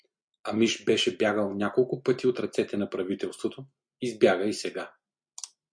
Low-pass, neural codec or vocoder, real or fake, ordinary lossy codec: 9.9 kHz; none; real; MP3, 64 kbps